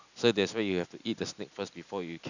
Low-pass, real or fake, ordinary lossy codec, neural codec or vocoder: 7.2 kHz; real; none; none